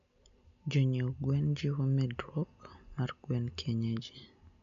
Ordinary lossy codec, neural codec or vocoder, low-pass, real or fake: MP3, 64 kbps; none; 7.2 kHz; real